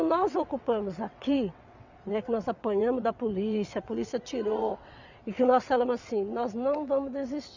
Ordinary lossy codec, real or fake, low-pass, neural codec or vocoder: none; fake; 7.2 kHz; vocoder, 22.05 kHz, 80 mel bands, WaveNeXt